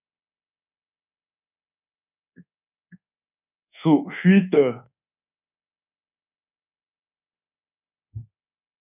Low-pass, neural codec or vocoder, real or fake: 3.6 kHz; codec, 24 kHz, 1.2 kbps, DualCodec; fake